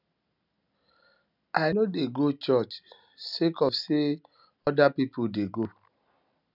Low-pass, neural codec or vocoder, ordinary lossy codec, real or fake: 5.4 kHz; none; none; real